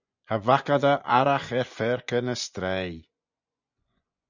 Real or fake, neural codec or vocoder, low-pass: fake; vocoder, 24 kHz, 100 mel bands, Vocos; 7.2 kHz